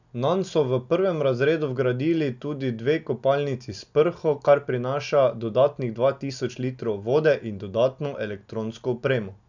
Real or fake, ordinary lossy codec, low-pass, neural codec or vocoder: real; none; 7.2 kHz; none